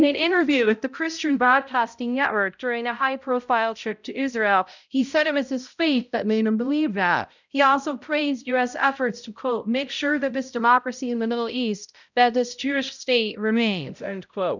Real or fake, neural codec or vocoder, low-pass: fake; codec, 16 kHz, 0.5 kbps, X-Codec, HuBERT features, trained on balanced general audio; 7.2 kHz